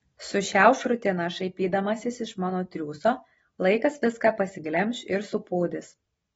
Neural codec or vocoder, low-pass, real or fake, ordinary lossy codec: none; 19.8 kHz; real; AAC, 24 kbps